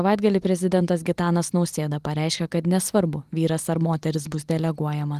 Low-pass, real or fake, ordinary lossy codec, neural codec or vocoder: 14.4 kHz; real; Opus, 24 kbps; none